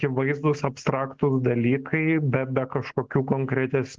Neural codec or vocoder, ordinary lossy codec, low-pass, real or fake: none; Opus, 16 kbps; 7.2 kHz; real